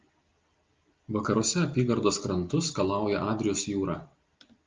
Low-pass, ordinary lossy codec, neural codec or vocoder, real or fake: 7.2 kHz; Opus, 32 kbps; none; real